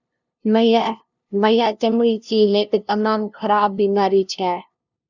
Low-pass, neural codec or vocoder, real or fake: 7.2 kHz; codec, 16 kHz, 0.5 kbps, FunCodec, trained on LibriTTS, 25 frames a second; fake